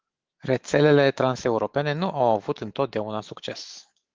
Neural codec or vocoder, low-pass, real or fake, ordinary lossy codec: autoencoder, 48 kHz, 128 numbers a frame, DAC-VAE, trained on Japanese speech; 7.2 kHz; fake; Opus, 16 kbps